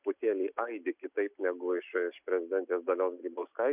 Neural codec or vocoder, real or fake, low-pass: none; real; 3.6 kHz